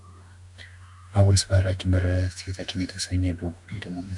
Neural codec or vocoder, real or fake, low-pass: autoencoder, 48 kHz, 32 numbers a frame, DAC-VAE, trained on Japanese speech; fake; 10.8 kHz